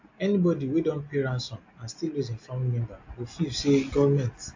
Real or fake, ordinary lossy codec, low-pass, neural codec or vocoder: real; AAC, 48 kbps; 7.2 kHz; none